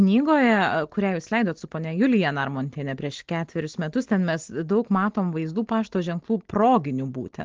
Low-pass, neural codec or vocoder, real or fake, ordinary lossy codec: 7.2 kHz; none; real; Opus, 16 kbps